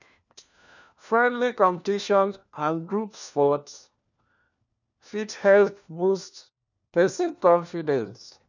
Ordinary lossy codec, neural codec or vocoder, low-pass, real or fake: none; codec, 16 kHz, 1 kbps, FunCodec, trained on LibriTTS, 50 frames a second; 7.2 kHz; fake